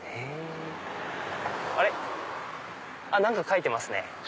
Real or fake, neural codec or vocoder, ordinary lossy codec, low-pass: real; none; none; none